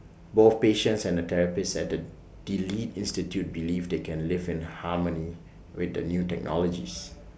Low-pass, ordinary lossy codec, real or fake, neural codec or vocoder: none; none; real; none